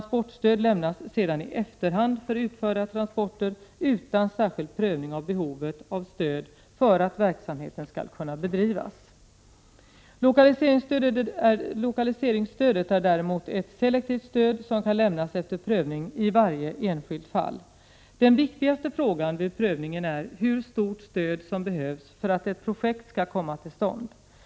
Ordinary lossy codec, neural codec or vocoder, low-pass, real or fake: none; none; none; real